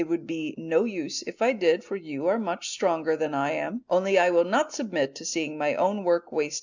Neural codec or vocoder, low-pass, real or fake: none; 7.2 kHz; real